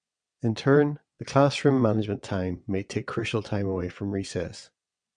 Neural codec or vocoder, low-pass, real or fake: vocoder, 22.05 kHz, 80 mel bands, WaveNeXt; 9.9 kHz; fake